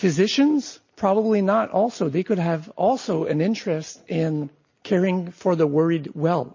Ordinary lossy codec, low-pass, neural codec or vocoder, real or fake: MP3, 32 kbps; 7.2 kHz; none; real